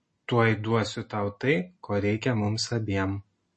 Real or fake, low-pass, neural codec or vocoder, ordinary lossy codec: real; 10.8 kHz; none; MP3, 32 kbps